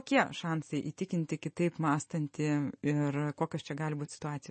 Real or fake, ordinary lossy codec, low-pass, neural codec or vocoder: real; MP3, 32 kbps; 10.8 kHz; none